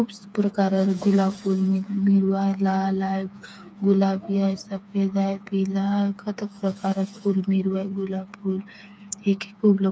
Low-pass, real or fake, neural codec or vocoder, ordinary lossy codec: none; fake; codec, 16 kHz, 4 kbps, FreqCodec, smaller model; none